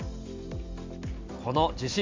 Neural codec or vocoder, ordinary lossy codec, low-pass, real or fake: none; MP3, 64 kbps; 7.2 kHz; real